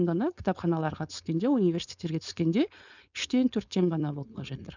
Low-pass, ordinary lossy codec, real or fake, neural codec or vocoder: 7.2 kHz; none; fake; codec, 16 kHz, 4.8 kbps, FACodec